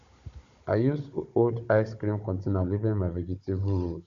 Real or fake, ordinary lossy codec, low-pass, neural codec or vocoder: fake; MP3, 96 kbps; 7.2 kHz; codec, 16 kHz, 16 kbps, FunCodec, trained on Chinese and English, 50 frames a second